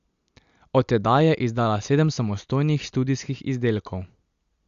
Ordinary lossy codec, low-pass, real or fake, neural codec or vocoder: Opus, 64 kbps; 7.2 kHz; real; none